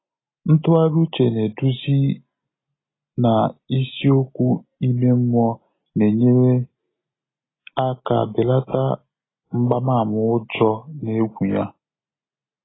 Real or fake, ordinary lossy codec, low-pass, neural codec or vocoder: real; AAC, 16 kbps; 7.2 kHz; none